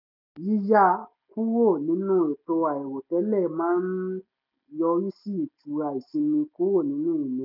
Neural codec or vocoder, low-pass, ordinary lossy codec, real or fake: none; 5.4 kHz; none; real